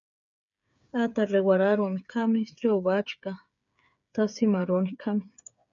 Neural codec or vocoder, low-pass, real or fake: codec, 16 kHz, 8 kbps, FreqCodec, smaller model; 7.2 kHz; fake